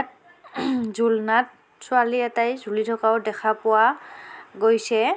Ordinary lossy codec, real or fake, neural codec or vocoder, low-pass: none; real; none; none